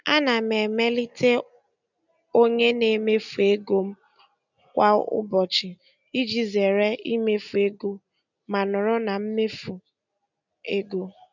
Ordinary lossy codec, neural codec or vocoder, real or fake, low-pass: none; none; real; 7.2 kHz